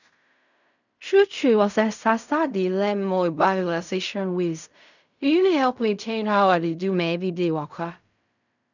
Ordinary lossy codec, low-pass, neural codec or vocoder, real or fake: none; 7.2 kHz; codec, 16 kHz in and 24 kHz out, 0.4 kbps, LongCat-Audio-Codec, fine tuned four codebook decoder; fake